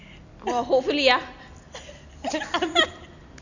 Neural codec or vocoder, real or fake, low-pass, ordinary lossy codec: none; real; 7.2 kHz; none